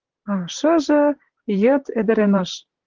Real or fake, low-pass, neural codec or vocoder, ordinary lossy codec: fake; 7.2 kHz; vocoder, 44.1 kHz, 128 mel bands, Pupu-Vocoder; Opus, 16 kbps